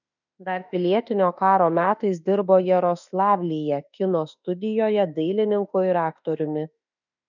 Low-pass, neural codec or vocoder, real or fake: 7.2 kHz; autoencoder, 48 kHz, 32 numbers a frame, DAC-VAE, trained on Japanese speech; fake